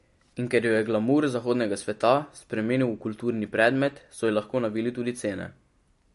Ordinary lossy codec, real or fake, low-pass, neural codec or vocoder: MP3, 48 kbps; real; 14.4 kHz; none